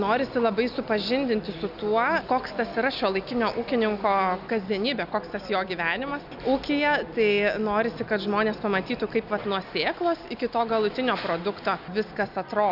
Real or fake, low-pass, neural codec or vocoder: real; 5.4 kHz; none